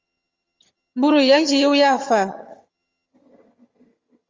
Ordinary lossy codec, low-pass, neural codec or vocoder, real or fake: Opus, 32 kbps; 7.2 kHz; vocoder, 22.05 kHz, 80 mel bands, HiFi-GAN; fake